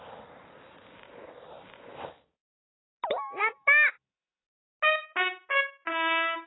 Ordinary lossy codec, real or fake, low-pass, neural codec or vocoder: AAC, 16 kbps; real; 7.2 kHz; none